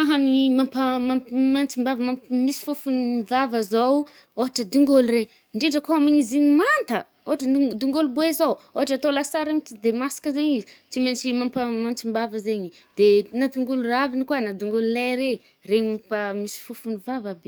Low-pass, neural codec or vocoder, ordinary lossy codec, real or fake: none; codec, 44.1 kHz, 7.8 kbps, DAC; none; fake